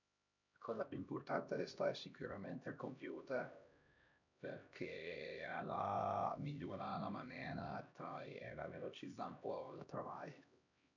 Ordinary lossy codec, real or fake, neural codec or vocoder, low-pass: none; fake; codec, 16 kHz, 1 kbps, X-Codec, HuBERT features, trained on LibriSpeech; 7.2 kHz